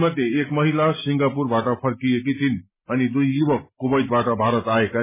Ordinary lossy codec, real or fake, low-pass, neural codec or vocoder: MP3, 16 kbps; real; 3.6 kHz; none